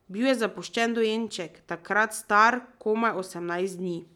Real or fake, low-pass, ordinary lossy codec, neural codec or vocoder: real; 19.8 kHz; none; none